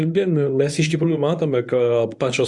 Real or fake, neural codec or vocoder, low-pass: fake; codec, 24 kHz, 0.9 kbps, WavTokenizer, medium speech release version 1; 10.8 kHz